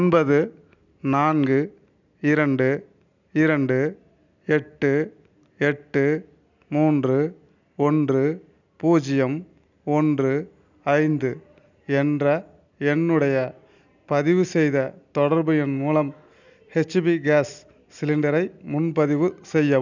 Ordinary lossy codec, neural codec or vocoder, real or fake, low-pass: none; none; real; 7.2 kHz